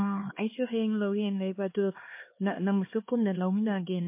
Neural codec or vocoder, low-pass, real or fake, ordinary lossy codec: codec, 16 kHz, 2 kbps, X-Codec, HuBERT features, trained on LibriSpeech; 3.6 kHz; fake; MP3, 24 kbps